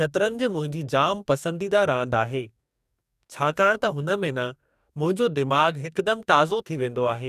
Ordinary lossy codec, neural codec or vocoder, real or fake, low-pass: none; codec, 44.1 kHz, 2.6 kbps, DAC; fake; 14.4 kHz